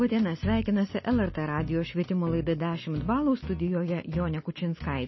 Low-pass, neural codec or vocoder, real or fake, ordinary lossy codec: 7.2 kHz; none; real; MP3, 24 kbps